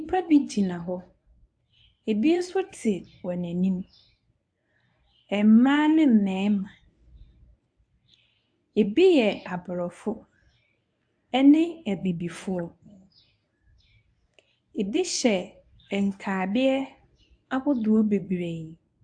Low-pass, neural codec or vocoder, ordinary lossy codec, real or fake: 9.9 kHz; codec, 24 kHz, 0.9 kbps, WavTokenizer, medium speech release version 1; AAC, 64 kbps; fake